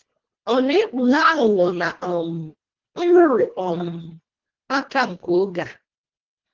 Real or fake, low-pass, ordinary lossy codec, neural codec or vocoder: fake; 7.2 kHz; Opus, 16 kbps; codec, 24 kHz, 1.5 kbps, HILCodec